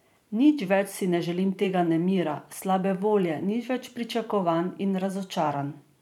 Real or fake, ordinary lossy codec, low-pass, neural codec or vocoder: fake; none; 19.8 kHz; vocoder, 44.1 kHz, 128 mel bands every 512 samples, BigVGAN v2